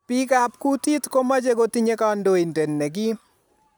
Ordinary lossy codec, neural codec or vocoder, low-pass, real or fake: none; none; none; real